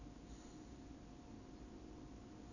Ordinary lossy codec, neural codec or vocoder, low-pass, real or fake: none; none; 7.2 kHz; real